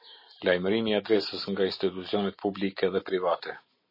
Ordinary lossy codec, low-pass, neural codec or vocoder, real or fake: MP3, 24 kbps; 5.4 kHz; none; real